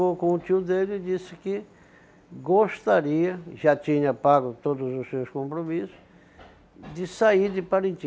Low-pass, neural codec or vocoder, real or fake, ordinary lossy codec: none; none; real; none